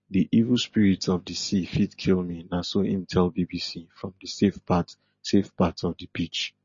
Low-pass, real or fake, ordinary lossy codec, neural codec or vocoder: 7.2 kHz; real; MP3, 32 kbps; none